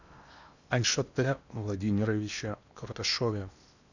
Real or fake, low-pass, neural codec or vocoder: fake; 7.2 kHz; codec, 16 kHz in and 24 kHz out, 0.8 kbps, FocalCodec, streaming, 65536 codes